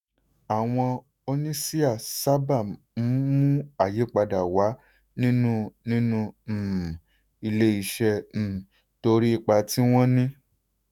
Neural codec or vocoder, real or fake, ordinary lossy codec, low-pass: autoencoder, 48 kHz, 128 numbers a frame, DAC-VAE, trained on Japanese speech; fake; none; 19.8 kHz